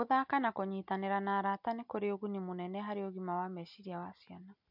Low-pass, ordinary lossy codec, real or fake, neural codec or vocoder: 5.4 kHz; MP3, 48 kbps; real; none